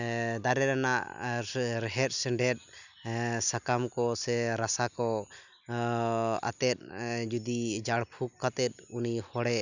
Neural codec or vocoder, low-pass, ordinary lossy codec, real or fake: none; 7.2 kHz; none; real